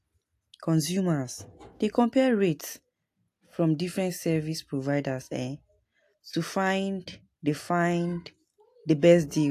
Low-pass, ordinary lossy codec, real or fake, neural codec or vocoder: 14.4 kHz; AAC, 64 kbps; real; none